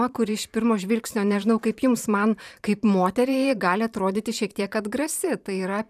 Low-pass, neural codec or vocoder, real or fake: 14.4 kHz; vocoder, 44.1 kHz, 128 mel bands every 512 samples, BigVGAN v2; fake